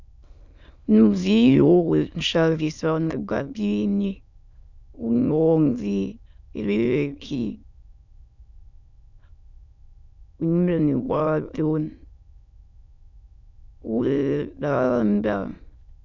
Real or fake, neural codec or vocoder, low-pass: fake; autoencoder, 22.05 kHz, a latent of 192 numbers a frame, VITS, trained on many speakers; 7.2 kHz